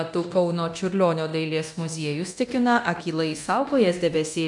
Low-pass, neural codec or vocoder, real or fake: 10.8 kHz; codec, 24 kHz, 0.9 kbps, DualCodec; fake